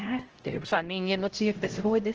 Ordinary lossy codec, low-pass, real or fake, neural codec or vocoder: Opus, 16 kbps; 7.2 kHz; fake; codec, 16 kHz, 0.5 kbps, X-Codec, HuBERT features, trained on LibriSpeech